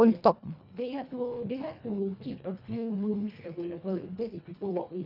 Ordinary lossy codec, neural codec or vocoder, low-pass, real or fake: none; codec, 24 kHz, 1.5 kbps, HILCodec; 5.4 kHz; fake